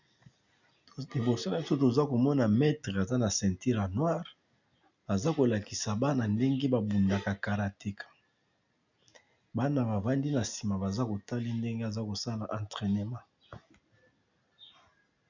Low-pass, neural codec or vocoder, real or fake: 7.2 kHz; none; real